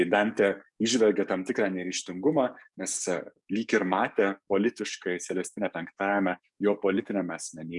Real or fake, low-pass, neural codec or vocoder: fake; 10.8 kHz; codec, 44.1 kHz, 7.8 kbps, DAC